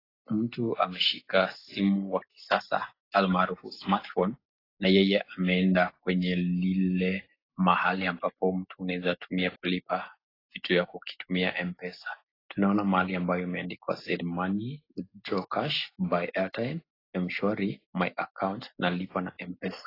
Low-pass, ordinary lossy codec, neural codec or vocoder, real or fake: 5.4 kHz; AAC, 24 kbps; none; real